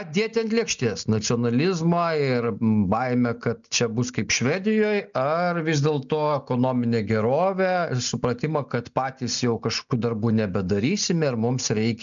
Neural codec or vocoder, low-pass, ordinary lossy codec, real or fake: none; 7.2 kHz; MP3, 96 kbps; real